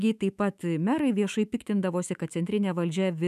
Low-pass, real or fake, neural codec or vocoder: 14.4 kHz; fake; autoencoder, 48 kHz, 128 numbers a frame, DAC-VAE, trained on Japanese speech